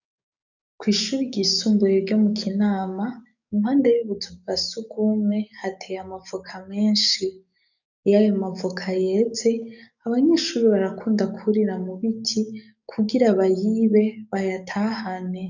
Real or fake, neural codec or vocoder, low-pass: fake; codec, 44.1 kHz, 7.8 kbps, DAC; 7.2 kHz